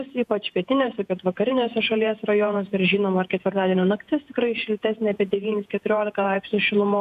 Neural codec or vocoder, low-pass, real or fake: vocoder, 48 kHz, 128 mel bands, Vocos; 14.4 kHz; fake